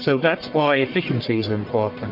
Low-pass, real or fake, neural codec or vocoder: 5.4 kHz; fake; codec, 44.1 kHz, 1.7 kbps, Pupu-Codec